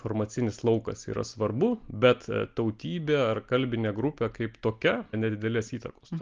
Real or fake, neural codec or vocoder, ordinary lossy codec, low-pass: real; none; Opus, 32 kbps; 7.2 kHz